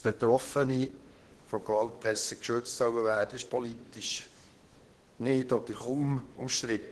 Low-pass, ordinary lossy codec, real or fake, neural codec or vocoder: 10.8 kHz; Opus, 16 kbps; fake; codec, 16 kHz in and 24 kHz out, 0.8 kbps, FocalCodec, streaming, 65536 codes